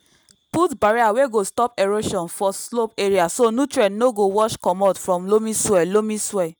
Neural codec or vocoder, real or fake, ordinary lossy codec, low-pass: none; real; none; none